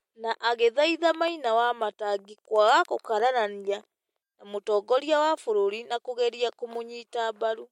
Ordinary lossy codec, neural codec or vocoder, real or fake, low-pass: MP3, 64 kbps; none; real; 19.8 kHz